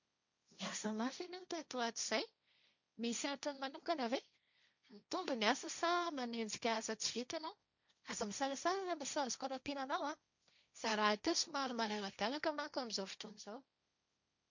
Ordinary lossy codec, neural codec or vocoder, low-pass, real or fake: none; codec, 16 kHz, 1.1 kbps, Voila-Tokenizer; 7.2 kHz; fake